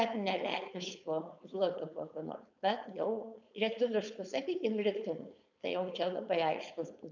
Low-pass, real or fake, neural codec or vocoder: 7.2 kHz; fake; codec, 16 kHz, 4.8 kbps, FACodec